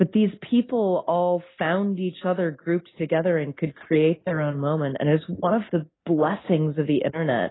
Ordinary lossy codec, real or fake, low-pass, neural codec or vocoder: AAC, 16 kbps; real; 7.2 kHz; none